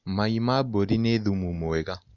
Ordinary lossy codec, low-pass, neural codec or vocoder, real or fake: none; 7.2 kHz; none; real